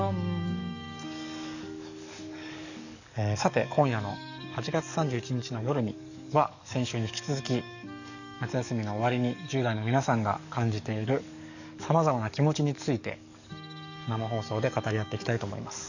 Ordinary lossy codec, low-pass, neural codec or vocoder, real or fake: none; 7.2 kHz; codec, 44.1 kHz, 7.8 kbps, DAC; fake